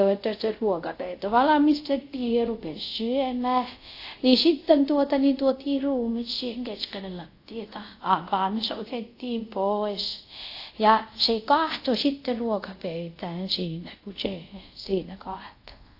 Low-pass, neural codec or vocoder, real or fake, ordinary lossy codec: 5.4 kHz; codec, 24 kHz, 0.5 kbps, DualCodec; fake; AAC, 32 kbps